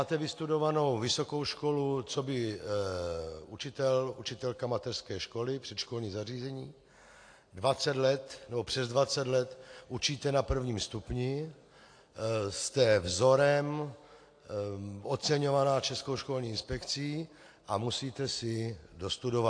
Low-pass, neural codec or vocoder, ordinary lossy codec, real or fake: 9.9 kHz; none; AAC, 48 kbps; real